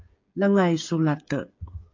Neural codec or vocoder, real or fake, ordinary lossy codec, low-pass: codec, 16 kHz, 8 kbps, FreqCodec, smaller model; fake; MP3, 48 kbps; 7.2 kHz